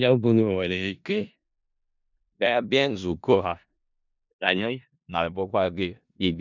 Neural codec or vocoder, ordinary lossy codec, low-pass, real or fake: codec, 16 kHz in and 24 kHz out, 0.4 kbps, LongCat-Audio-Codec, four codebook decoder; none; 7.2 kHz; fake